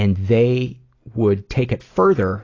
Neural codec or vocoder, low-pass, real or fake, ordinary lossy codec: none; 7.2 kHz; real; AAC, 32 kbps